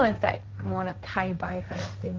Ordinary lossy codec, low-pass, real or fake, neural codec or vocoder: Opus, 32 kbps; 7.2 kHz; fake; codec, 16 kHz, 1.1 kbps, Voila-Tokenizer